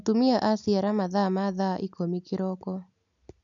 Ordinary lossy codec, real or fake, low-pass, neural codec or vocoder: none; real; 7.2 kHz; none